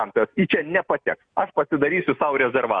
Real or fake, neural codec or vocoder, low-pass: real; none; 9.9 kHz